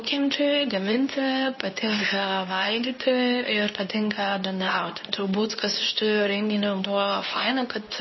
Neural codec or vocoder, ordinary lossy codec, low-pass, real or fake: codec, 24 kHz, 0.9 kbps, WavTokenizer, medium speech release version 2; MP3, 24 kbps; 7.2 kHz; fake